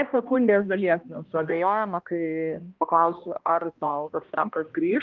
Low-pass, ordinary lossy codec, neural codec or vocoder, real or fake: 7.2 kHz; Opus, 32 kbps; codec, 16 kHz, 1 kbps, X-Codec, HuBERT features, trained on balanced general audio; fake